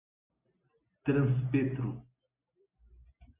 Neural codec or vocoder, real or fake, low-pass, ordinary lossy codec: none; real; 3.6 kHz; Opus, 64 kbps